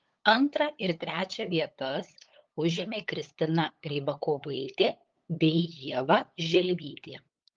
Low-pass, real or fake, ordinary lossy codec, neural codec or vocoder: 7.2 kHz; fake; Opus, 32 kbps; codec, 16 kHz, 8 kbps, FunCodec, trained on LibriTTS, 25 frames a second